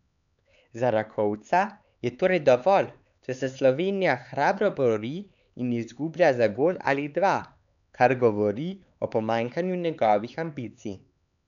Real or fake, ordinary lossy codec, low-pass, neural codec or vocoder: fake; none; 7.2 kHz; codec, 16 kHz, 4 kbps, X-Codec, HuBERT features, trained on LibriSpeech